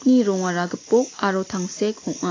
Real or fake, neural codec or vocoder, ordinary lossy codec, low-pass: real; none; AAC, 32 kbps; 7.2 kHz